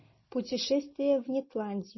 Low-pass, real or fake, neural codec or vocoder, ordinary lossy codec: 7.2 kHz; real; none; MP3, 24 kbps